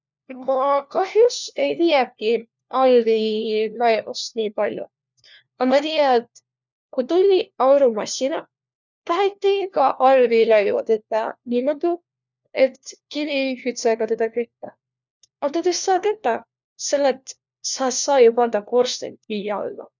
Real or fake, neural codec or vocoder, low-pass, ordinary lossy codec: fake; codec, 16 kHz, 1 kbps, FunCodec, trained on LibriTTS, 50 frames a second; 7.2 kHz; none